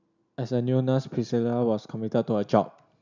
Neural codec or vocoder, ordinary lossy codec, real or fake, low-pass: none; none; real; 7.2 kHz